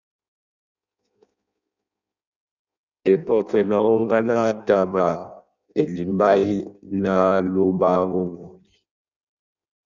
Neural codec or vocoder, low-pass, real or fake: codec, 16 kHz in and 24 kHz out, 0.6 kbps, FireRedTTS-2 codec; 7.2 kHz; fake